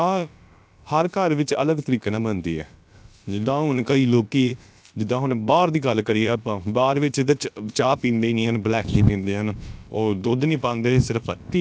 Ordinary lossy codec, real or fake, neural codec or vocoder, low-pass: none; fake; codec, 16 kHz, about 1 kbps, DyCAST, with the encoder's durations; none